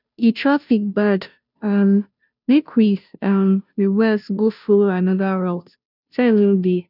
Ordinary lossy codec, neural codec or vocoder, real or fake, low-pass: none; codec, 16 kHz, 0.5 kbps, FunCodec, trained on Chinese and English, 25 frames a second; fake; 5.4 kHz